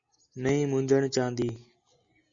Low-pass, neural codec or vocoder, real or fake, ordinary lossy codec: 7.2 kHz; none; real; Opus, 64 kbps